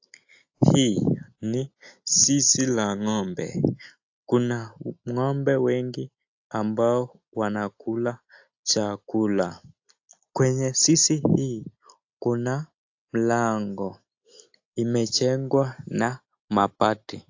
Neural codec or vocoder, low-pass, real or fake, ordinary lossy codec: none; 7.2 kHz; real; AAC, 48 kbps